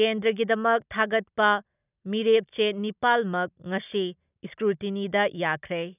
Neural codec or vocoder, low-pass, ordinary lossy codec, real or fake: none; 3.6 kHz; none; real